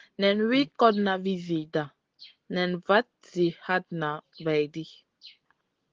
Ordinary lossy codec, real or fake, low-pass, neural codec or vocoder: Opus, 16 kbps; real; 7.2 kHz; none